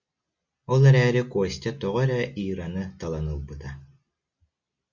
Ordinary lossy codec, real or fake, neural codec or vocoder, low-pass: Opus, 64 kbps; real; none; 7.2 kHz